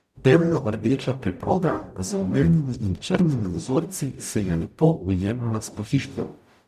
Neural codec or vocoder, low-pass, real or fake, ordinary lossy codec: codec, 44.1 kHz, 0.9 kbps, DAC; 14.4 kHz; fake; none